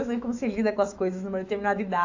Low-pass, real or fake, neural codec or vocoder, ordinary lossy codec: 7.2 kHz; fake; autoencoder, 48 kHz, 128 numbers a frame, DAC-VAE, trained on Japanese speech; AAC, 48 kbps